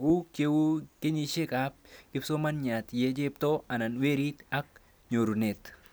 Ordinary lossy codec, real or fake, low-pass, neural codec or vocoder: none; real; none; none